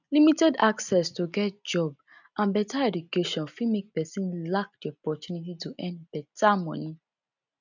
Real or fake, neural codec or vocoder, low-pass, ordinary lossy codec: real; none; 7.2 kHz; none